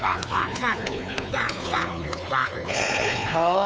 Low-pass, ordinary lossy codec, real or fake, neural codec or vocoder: none; none; fake; codec, 16 kHz, 4 kbps, X-Codec, WavLM features, trained on Multilingual LibriSpeech